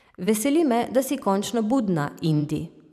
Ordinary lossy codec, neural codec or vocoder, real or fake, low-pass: none; vocoder, 44.1 kHz, 128 mel bands every 512 samples, BigVGAN v2; fake; 14.4 kHz